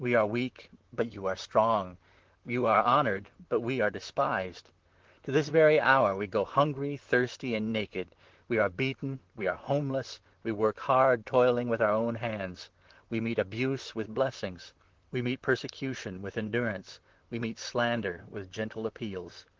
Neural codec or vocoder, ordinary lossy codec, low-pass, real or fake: vocoder, 44.1 kHz, 128 mel bands, Pupu-Vocoder; Opus, 16 kbps; 7.2 kHz; fake